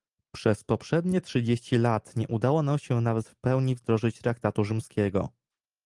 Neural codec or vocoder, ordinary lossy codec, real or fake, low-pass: none; Opus, 24 kbps; real; 10.8 kHz